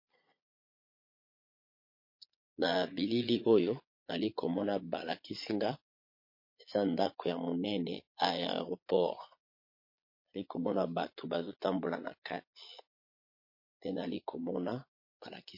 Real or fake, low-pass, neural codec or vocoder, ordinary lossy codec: fake; 5.4 kHz; vocoder, 22.05 kHz, 80 mel bands, Vocos; MP3, 32 kbps